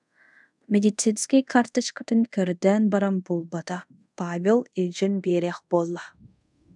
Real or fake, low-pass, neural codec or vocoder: fake; 10.8 kHz; codec, 24 kHz, 0.5 kbps, DualCodec